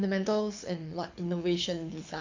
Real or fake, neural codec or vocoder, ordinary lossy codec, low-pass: fake; codec, 24 kHz, 6 kbps, HILCodec; AAC, 48 kbps; 7.2 kHz